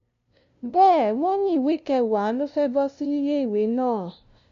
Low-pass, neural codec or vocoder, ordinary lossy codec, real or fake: 7.2 kHz; codec, 16 kHz, 0.5 kbps, FunCodec, trained on LibriTTS, 25 frames a second; AAC, 96 kbps; fake